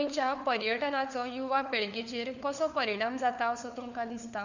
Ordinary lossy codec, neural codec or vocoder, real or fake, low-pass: none; codec, 16 kHz, 4 kbps, FunCodec, trained on LibriTTS, 50 frames a second; fake; 7.2 kHz